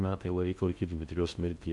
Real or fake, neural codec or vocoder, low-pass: fake; codec, 16 kHz in and 24 kHz out, 0.6 kbps, FocalCodec, streaming, 2048 codes; 10.8 kHz